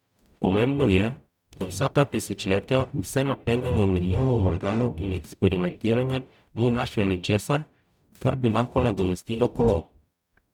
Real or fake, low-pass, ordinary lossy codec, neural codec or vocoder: fake; 19.8 kHz; none; codec, 44.1 kHz, 0.9 kbps, DAC